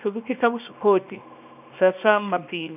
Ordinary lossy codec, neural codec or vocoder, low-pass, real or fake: none; codec, 24 kHz, 0.9 kbps, WavTokenizer, small release; 3.6 kHz; fake